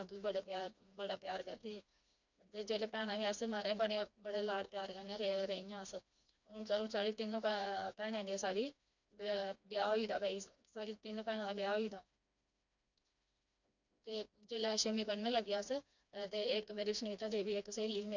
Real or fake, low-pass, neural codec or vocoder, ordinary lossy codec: fake; 7.2 kHz; codec, 16 kHz, 2 kbps, FreqCodec, smaller model; none